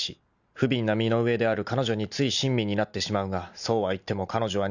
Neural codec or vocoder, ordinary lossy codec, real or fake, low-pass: none; none; real; 7.2 kHz